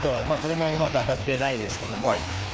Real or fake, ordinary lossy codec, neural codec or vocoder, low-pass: fake; none; codec, 16 kHz, 2 kbps, FreqCodec, larger model; none